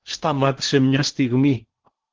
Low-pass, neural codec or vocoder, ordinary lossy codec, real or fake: 7.2 kHz; codec, 16 kHz in and 24 kHz out, 0.8 kbps, FocalCodec, streaming, 65536 codes; Opus, 16 kbps; fake